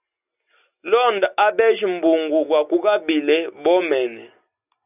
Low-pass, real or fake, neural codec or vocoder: 3.6 kHz; real; none